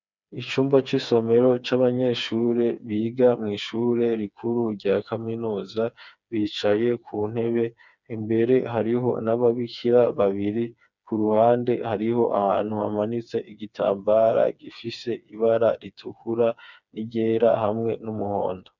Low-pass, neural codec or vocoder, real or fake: 7.2 kHz; codec, 16 kHz, 4 kbps, FreqCodec, smaller model; fake